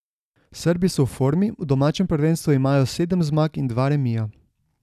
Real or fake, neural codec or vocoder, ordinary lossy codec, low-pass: real; none; none; 14.4 kHz